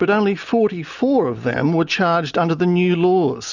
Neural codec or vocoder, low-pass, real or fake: none; 7.2 kHz; real